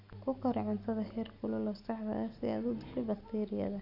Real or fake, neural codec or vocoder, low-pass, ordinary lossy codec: real; none; 5.4 kHz; none